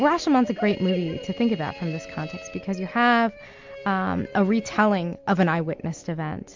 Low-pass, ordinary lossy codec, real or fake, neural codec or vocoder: 7.2 kHz; MP3, 64 kbps; real; none